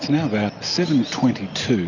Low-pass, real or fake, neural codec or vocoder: 7.2 kHz; real; none